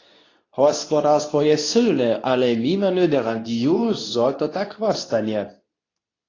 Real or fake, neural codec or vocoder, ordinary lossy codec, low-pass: fake; codec, 24 kHz, 0.9 kbps, WavTokenizer, medium speech release version 2; AAC, 32 kbps; 7.2 kHz